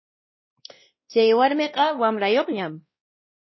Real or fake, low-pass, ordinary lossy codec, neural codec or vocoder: fake; 7.2 kHz; MP3, 24 kbps; codec, 16 kHz, 1 kbps, X-Codec, WavLM features, trained on Multilingual LibriSpeech